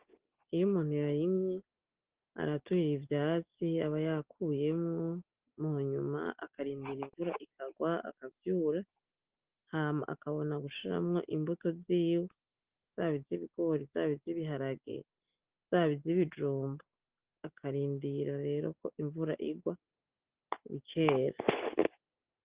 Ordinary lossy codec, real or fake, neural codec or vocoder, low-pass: Opus, 16 kbps; real; none; 3.6 kHz